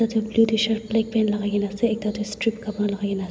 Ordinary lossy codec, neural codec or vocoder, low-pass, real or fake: none; none; none; real